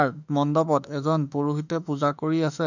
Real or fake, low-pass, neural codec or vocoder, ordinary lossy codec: fake; 7.2 kHz; autoencoder, 48 kHz, 32 numbers a frame, DAC-VAE, trained on Japanese speech; none